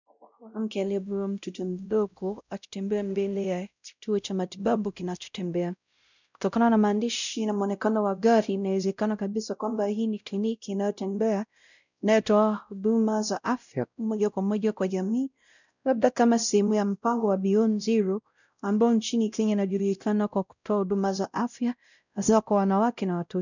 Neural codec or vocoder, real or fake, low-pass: codec, 16 kHz, 0.5 kbps, X-Codec, WavLM features, trained on Multilingual LibriSpeech; fake; 7.2 kHz